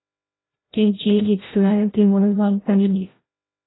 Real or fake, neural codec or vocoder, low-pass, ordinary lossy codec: fake; codec, 16 kHz, 0.5 kbps, FreqCodec, larger model; 7.2 kHz; AAC, 16 kbps